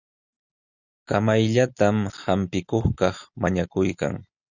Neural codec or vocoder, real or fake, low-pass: none; real; 7.2 kHz